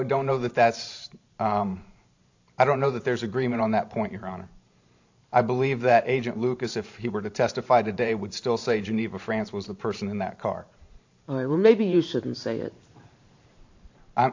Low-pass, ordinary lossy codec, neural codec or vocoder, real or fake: 7.2 kHz; AAC, 48 kbps; vocoder, 44.1 kHz, 128 mel bands every 512 samples, BigVGAN v2; fake